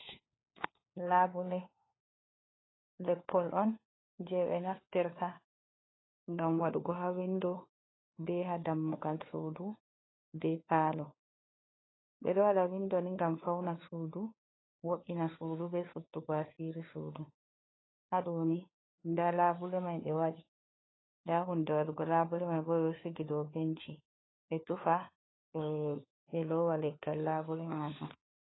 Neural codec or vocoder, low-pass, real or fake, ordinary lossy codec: codec, 16 kHz, 4 kbps, FunCodec, trained on LibriTTS, 50 frames a second; 7.2 kHz; fake; AAC, 16 kbps